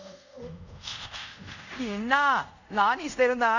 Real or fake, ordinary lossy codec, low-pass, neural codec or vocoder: fake; none; 7.2 kHz; codec, 24 kHz, 0.5 kbps, DualCodec